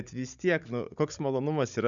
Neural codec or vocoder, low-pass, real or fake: codec, 16 kHz, 16 kbps, FunCodec, trained on Chinese and English, 50 frames a second; 7.2 kHz; fake